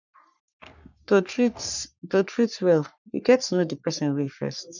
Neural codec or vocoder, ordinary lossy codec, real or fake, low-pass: codec, 44.1 kHz, 3.4 kbps, Pupu-Codec; none; fake; 7.2 kHz